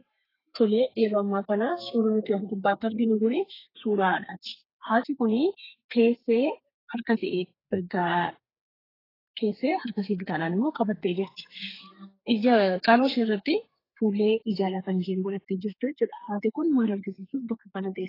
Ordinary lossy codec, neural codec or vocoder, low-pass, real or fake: AAC, 24 kbps; codec, 32 kHz, 1.9 kbps, SNAC; 5.4 kHz; fake